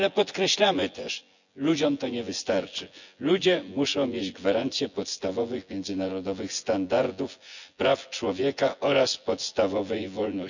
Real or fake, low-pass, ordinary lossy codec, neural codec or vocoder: fake; 7.2 kHz; none; vocoder, 24 kHz, 100 mel bands, Vocos